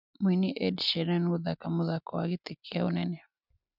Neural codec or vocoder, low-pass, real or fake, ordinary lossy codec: none; 5.4 kHz; real; none